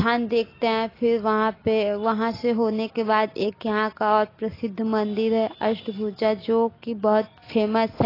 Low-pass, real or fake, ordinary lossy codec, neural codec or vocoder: 5.4 kHz; real; AAC, 24 kbps; none